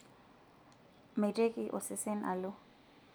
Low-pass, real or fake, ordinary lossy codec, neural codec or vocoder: none; real; none; none